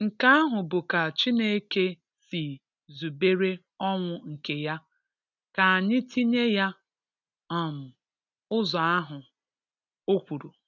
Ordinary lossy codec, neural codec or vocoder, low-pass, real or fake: none; none; 7.2 kHz; real